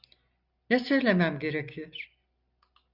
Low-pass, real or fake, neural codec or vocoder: 5.4 kHz; real; none